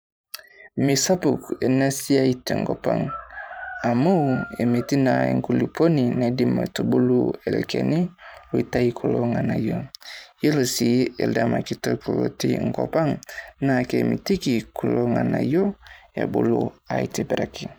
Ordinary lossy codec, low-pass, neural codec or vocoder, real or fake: none; none; vocoder, 44.1 kHz, 128 mel bands every 512 samples, BigVGAN v2; fake